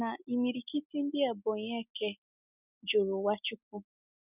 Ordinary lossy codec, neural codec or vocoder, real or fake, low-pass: none; none; real; 3.6 kHz